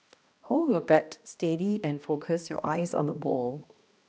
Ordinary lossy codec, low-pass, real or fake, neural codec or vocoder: none; none; fake; codec, 16 kHz, 1 kbps, X-Codec, HuBERT features, trained on balanced general audio